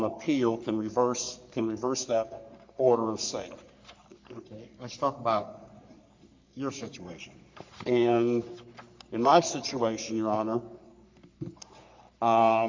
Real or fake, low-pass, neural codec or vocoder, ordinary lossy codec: fake; 7.2 kHz; codec, 44.1 kHz, 3.4 kbps, Pupu-Codec; MP3, 48 kbps